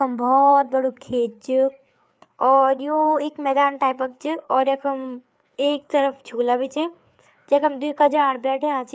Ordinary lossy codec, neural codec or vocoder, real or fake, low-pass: none; codec, 16 kHz, 4 kbps, FreqCodec, larger model; fake; none